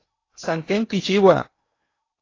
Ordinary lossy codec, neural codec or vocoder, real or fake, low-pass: AAC, 32 kbps; codec, 16 kHz in and 24 kHz out, 0.8 kbps, FocalCodec, streaming, 65536 codes; fake; 7.2 kHz